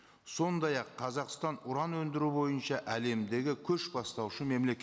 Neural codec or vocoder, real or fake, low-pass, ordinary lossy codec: none; real; none; none